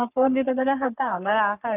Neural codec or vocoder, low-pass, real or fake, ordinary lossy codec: codec, 44.1 kHz, 3.4 kbps, Pupu-Codec; 3.6 kHz; fake; none